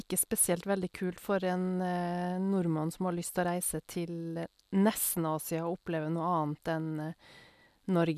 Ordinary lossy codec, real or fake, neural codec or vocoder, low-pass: none; real; none; 14.4 kHz